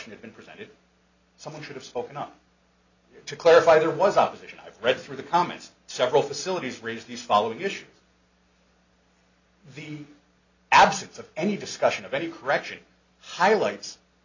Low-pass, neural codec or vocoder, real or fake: 7.2 kHz; none; real